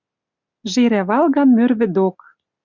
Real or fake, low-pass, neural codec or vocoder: real; 7.2 kHz; none